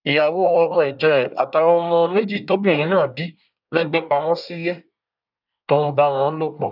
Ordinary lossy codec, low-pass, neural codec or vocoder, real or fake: none; 5.4 kHz; codec, 24 kHz, 1 kbps, SNAC; fake